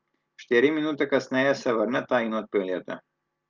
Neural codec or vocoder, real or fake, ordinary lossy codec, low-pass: none; real; Opus, 32 kbps; 7.2 kHz